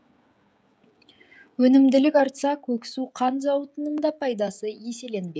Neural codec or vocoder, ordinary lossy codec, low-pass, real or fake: codec, 16 kHz, 16 kbps, FreqCodec, smaller model; none; none; fake